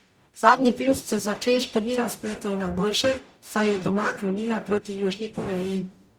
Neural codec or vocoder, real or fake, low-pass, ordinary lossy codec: codec, 44.1 kHz, 0.9 kbps, DAC; fake; 19.8 kHz; Opus, 64 kbps